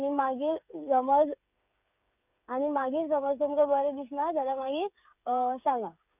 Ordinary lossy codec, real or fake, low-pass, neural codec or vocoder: none; fake; 3.6 kHz; codec, 16 kHz, 8 kbps, FreqCodec, smaller model